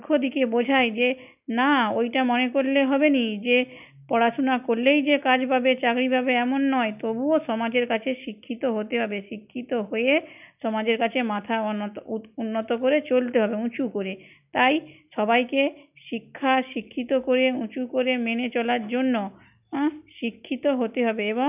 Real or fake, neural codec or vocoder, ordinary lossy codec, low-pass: real; none; none; 3.6 kHz